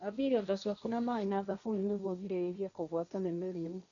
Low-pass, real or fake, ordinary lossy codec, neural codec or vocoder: 7.2 kHz; fake; none; codec, 16 kHz, 1.1 kbps, Voila-Tokenizer